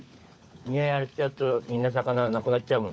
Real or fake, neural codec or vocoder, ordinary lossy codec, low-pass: fake; codec, 16 kHz, 16 kbps, FunCodec, trained on LibriTTS, 50 frames a second; none; none